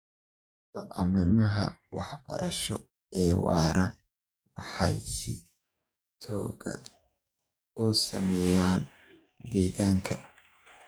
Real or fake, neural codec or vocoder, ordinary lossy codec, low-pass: fake; codec, 44.1 kHz, 2.6 kbps, DAC; none; none